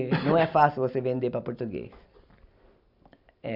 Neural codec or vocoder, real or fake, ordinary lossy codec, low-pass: none; real; none; 5.4 kHz